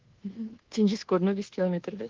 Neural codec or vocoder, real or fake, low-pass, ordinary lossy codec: codec, 24 kHz, 1.2 kbps, DualCodec; fake; 7.2 kHz; Opus, 16 kbps